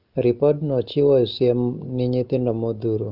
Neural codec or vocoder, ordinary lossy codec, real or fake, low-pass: none; Opus, 32 kbps; real; 5.4 kHz